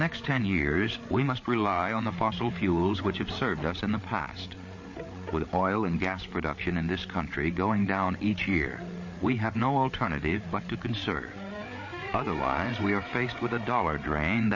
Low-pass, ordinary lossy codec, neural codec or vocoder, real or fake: 7.2 kHz; MP3, 32 kbps; codec, 16 kHz, 8 kbps, FreqCodec, larger model; fake